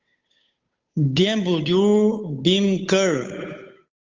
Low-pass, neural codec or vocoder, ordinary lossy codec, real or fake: 7.2 kHz; codec, 16 kHz, 8 kbps, FunCodec, trained on Chinese and English, 25 frames a second; Opus, 32 kbps; fake